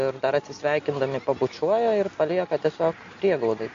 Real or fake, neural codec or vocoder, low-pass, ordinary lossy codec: real; none; 7.2 kHz; MP3, 48 kbps